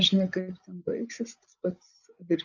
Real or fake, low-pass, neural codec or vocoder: fake; 7.2 kHz; codec, 16 kHz, 8 kbps, FreqCodec, larger model